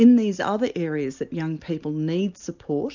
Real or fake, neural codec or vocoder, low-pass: real; none; 7.2 kHz